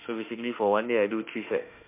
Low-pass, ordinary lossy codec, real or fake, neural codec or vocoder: 3.6 kHz; MP3, 32 kbps; fake; autoencoder, 48 kHz, 32 numbers a frame, DAC-VAE, trained on Japanese speech